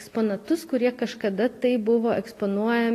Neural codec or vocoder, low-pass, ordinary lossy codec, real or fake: none; 14.4 kHz; AAC, 48 kbps; real